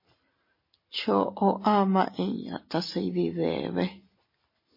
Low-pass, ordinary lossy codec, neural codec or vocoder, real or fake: 5.4 kHz; MP3, 24 kbps; none; real